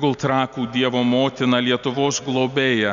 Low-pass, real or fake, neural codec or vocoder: 7.2 kHz; real; none